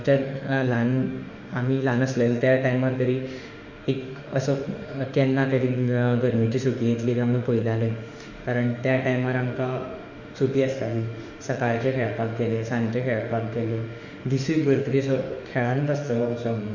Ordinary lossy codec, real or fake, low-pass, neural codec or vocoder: Opus, 64 kbps; fake; 7.2 kHz; autoencoder, 48 kHz, 32 numbers a frame, DAC-VAE, trained on Japanese speech